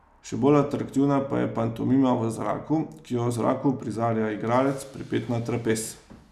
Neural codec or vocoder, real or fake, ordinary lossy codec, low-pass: none; real; none; 14.4 kHz